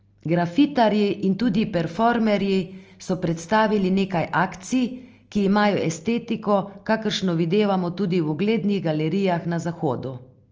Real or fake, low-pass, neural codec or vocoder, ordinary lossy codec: real; 7.2 kHz; none; Opus, 24 kbps